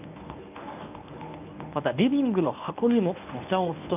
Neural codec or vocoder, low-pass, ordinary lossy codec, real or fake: codec, 24 kHz, 0.9 kbps, WavTokenizer, medium speech release version 1; 3.6 kHz; none; fake